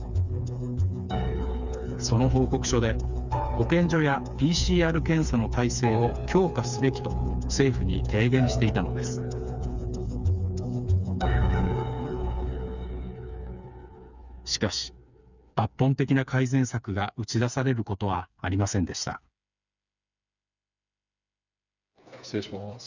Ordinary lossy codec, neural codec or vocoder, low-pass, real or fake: none; codec, 16 kHz, 4 kbps, FreqCodec, smaller model; 7.2 kHz; fake